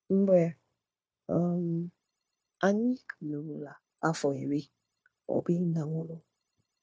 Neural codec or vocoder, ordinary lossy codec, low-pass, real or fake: codec, 16 kHz, 0.9 kbps, LongCat-Audio-Codec; none; none; fake